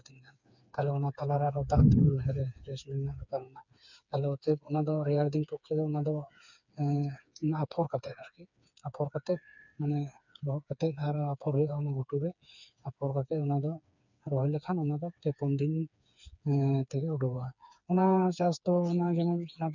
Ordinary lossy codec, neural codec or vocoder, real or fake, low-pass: none; codec, 16 kHz, 4 kbps, FreqCodec, smaller model; fake; 7.2 kHz